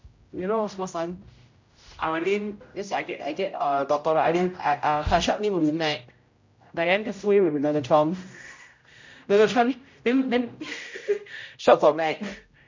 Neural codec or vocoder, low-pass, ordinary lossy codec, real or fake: codec, 16 kHz, 0.5 kbps, X-Codec, HuBERT features, trained on general audio; 7.2 kHz; MP3, 48 kbps; fake